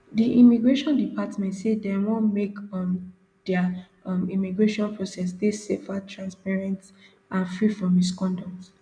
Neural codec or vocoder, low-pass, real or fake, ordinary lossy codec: none; 9.9 kHz; real; none